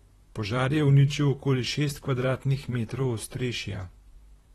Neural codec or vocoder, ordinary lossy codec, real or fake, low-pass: vocoder, 44.1 kHz, 128 mel bands, Pupu-Vocoder; AAC, 32 kbps; fake; 19.8 kHz